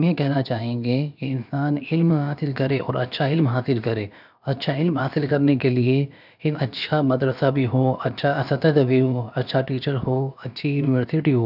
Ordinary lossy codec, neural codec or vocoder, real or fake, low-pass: none; codec, 16 kHz, about 1 kbps, DyCAST, with the encoder's durations; fake; 5.4 kHz